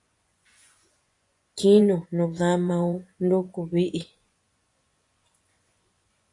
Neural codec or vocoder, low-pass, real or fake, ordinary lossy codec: vocoder, 24 kHz, 100 mel bands, Vocos; 10.8 kHz; fake; AAC, 48 kbps